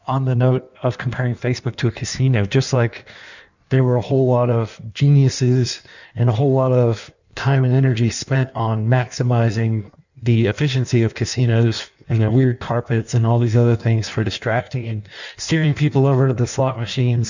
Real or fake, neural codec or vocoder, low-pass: fake; codec, 16 kHz in and 24 kHz out, 1.1 kbps, FireRedTTS-2 codec; 7.2 kHz